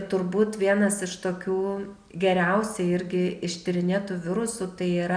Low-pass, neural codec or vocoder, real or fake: 9.9 kHz; none; real